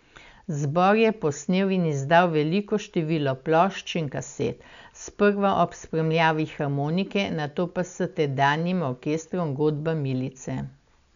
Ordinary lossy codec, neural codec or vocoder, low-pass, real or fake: none; none; 7.2 kHz; real